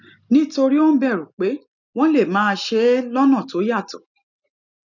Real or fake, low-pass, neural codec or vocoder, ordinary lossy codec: real; 7.2 kHz; none; none